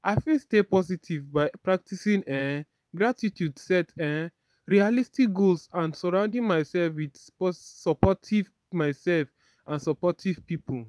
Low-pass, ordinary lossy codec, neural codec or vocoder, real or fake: none; none; vocoder, 22.05 kHz, 80 mel bands, Vocos; fake